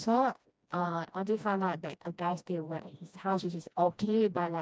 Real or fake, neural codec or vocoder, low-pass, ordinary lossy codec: fake; codec, 16 kHz, 1 kbps, FreqCodec, smaller model; none; none